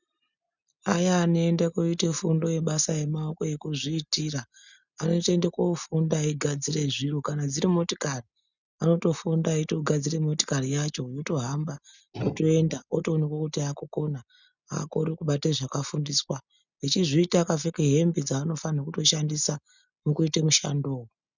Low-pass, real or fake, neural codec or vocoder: 7.2 kHz; real; none